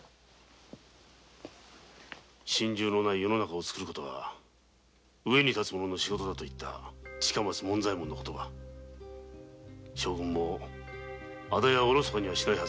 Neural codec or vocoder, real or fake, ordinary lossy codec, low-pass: none; real; none; none